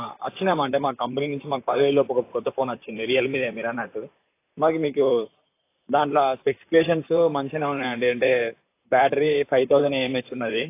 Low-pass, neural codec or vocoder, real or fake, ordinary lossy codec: 3.6 kHz; vocoder, 44.1 kHz, 128 mel bands, Pupu-Vocoder; fake; AAC, 24 kbps